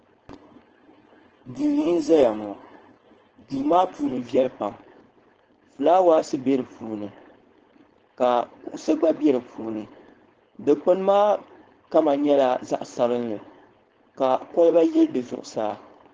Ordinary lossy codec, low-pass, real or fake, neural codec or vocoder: Opus, 16 kbps; 7.2 kHz; fake; codec, 16 kHz, 4.8 kbps, FACodec